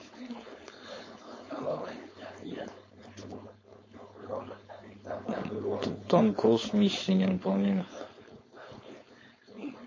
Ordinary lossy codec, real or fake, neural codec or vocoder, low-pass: MP3, 32 kbps; fake; codec, 16 kHz, 4.8 kbps, FACodec; 7.2 kHz